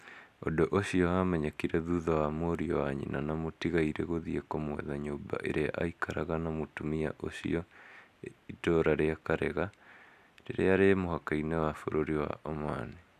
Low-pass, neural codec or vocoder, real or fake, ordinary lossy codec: 14.4 kHz; none; real; none